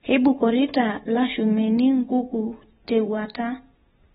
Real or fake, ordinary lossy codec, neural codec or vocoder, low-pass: real; AAC, 16 kbps; none; 19.8 kHz